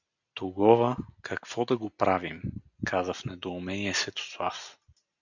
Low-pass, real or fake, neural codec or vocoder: 7.2 kHz; real; none